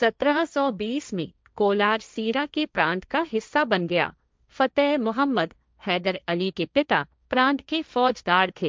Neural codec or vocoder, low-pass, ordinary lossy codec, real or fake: codec, 16 kHz, 1.1 kbps, Voila-Tokenizer; none; none; fake